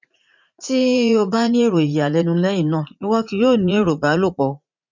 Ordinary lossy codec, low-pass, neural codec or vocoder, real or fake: MP3, 64 kbps; 7.2 kHz; vocoder, 44.1 kHz, 80 mel bands, Vocos; fake